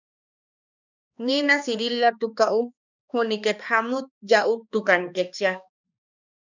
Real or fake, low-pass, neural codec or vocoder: fake; 7.2 kHz; codec, 16 kHz, 2 kbps, X-Codec, HuBERT features, trained on balanced general audio